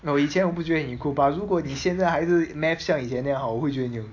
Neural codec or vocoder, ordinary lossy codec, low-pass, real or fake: none; AAC, 48 kbps; 7.2 kHz; real